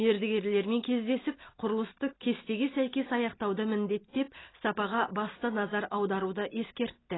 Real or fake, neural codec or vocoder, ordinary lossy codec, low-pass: real; none; AAC, 16 kbps; 7.2 kHz